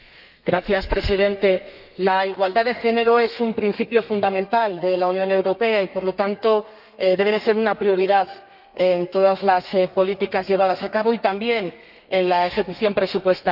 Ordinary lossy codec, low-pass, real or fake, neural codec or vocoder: none; 5.4 kHz; fake; codec, 32 kHz, 1.9 kbps, SNAC